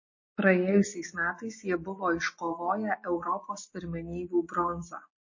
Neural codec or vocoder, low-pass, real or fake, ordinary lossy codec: none; 7.2 kHz; real; MP3, 32 kbps